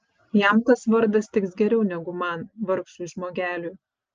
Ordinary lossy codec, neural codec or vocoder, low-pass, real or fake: Opus, 24 kbps; none; 7.2 kHz; real